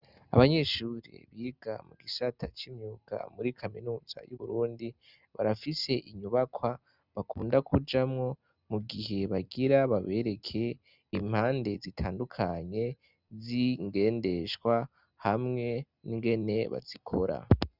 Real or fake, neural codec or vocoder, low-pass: real; none; 5.4 kHz